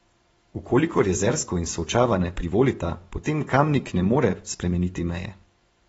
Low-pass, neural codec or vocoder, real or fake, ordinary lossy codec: 10.8 kHz; vocoder, 24 kHz, 100 mel bands, Vocos; fake; AAC, 24 kbps